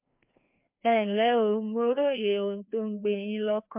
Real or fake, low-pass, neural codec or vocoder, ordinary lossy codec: fake; 3.6 kHz; codec, 16 kHz, 2 kbps, FreqCodec, larger model; MP3, 32 kbps